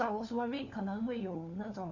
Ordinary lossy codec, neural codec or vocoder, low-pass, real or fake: none; codec, 16 kHz, 4 kbps, FunCodec, trained on LibriTTS, 50 frames a second; 7.2 kHz; fake